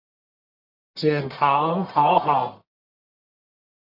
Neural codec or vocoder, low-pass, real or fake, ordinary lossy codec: codec, 44.1 kHz, 1.7 kbps, Pupu-Codec; 5.4 kHz; fake; MP3, 48 kbps